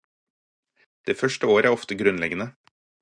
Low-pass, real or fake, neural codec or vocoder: 9.9 kHz; real; none